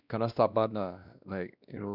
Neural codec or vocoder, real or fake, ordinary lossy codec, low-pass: codec, 16 kHz, 1 kbps, X-Codec, WavLM features, trained on Multilingual LibriSpeech; fake; none; 5.4 kHz